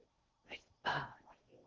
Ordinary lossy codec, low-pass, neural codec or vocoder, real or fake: Opus, 16 kbps; 7.2 kHz; codec, 16 kHz in and 24 kHz out, 0.6 kbps, FocalCodec, streaming, 4096 codes; fake